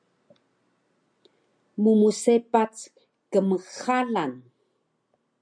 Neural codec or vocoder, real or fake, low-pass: none; real; 9.9 kHz